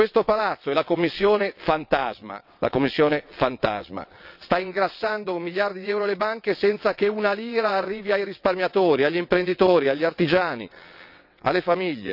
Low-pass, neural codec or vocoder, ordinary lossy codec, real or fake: 5.4 kHz; vocoder, 22.05 kHz, 80 mel bands, WaveNeXt; none; fake